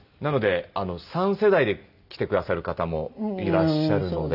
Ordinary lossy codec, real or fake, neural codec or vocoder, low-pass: none; real; none; 5.4 kHz